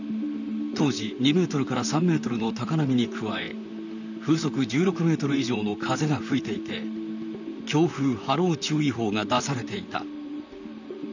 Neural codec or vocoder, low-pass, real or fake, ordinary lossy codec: vocoder, 44.1 kHz, 128 mel bands, Pupu-Vocoder; 7.2 kHz; fake; none